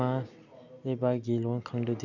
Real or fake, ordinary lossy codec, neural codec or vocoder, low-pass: real; none; none; 7.2 kHz